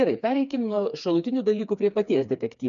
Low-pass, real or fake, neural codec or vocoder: 7.2 kHz; fake; codec, 16 kHz, 4 kbps, FreqCodec, smaller model